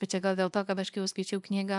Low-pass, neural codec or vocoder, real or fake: 10.8 kHz; codec, 24 kHz, 0.9 kbps, WavTokenizer, small release; fake